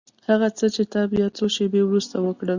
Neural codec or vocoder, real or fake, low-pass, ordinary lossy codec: none; real; 7.2 kHz; Opus, 64 kbps